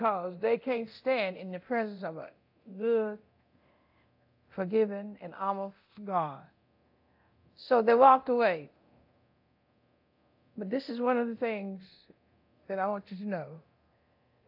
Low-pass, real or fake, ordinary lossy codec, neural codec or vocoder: 5.4 kHz; fake; AAC, 48 kbps; codec, 24 kHz, 0.9 kbps, DualCodec